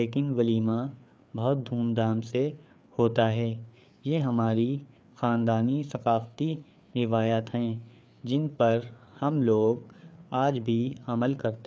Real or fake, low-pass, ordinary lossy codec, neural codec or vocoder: fake; none; none; codec, 16 kHz, 4 kbps, FreqCodec, larger model